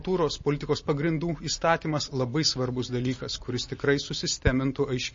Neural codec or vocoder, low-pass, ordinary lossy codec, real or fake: none; 7.2 kHz; MP3, 32 kbps; real